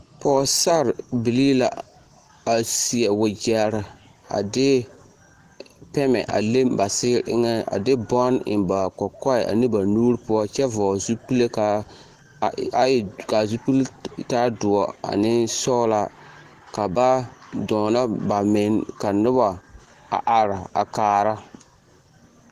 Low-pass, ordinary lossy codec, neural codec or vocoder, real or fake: 14.4 kHz; Opus, 16 kbps; none; real